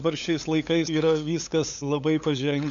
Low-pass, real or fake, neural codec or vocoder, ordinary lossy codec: 7.2 kHz; fake; codec, 16 kHz, 8 kbps, FunCodec, trained on LibriTTS, 25 frames a second; AAC, 64 kbps